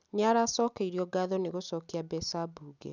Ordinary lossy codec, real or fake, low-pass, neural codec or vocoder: none; real; 7.2 kHz; none